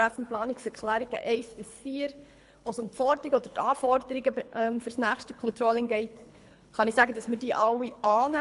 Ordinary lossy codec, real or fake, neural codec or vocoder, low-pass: MP3, 64 kbps; fake; codec, 24 kHz, 3 kbps, HILCodec; 10.8 kHz